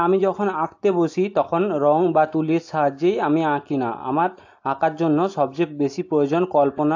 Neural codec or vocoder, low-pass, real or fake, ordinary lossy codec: none; 7.2 kHz; real; AAC, 48 kbps